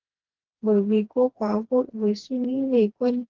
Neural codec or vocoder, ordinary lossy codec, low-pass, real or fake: codec, 16 kHz, 2 kbps, FreqCodec, smaller model; Opus, 16 kbps; 7.2 kHz; fake